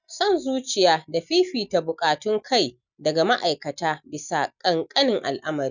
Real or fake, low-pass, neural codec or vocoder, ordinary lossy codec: real; 7.2 kHz; none; none